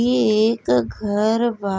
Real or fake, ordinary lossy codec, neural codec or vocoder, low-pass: real; none; none; none